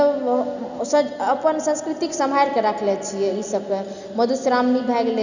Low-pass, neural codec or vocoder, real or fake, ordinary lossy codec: 7.2 kHz; none; real; none